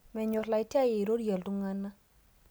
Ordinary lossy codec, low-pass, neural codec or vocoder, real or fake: none; none; none; real